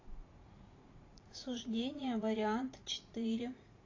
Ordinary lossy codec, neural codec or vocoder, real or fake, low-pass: AAC, 32 kbps; vocoder, 44.1 kHz, 80 mel bands, Vocos; fake; 7.2 kHz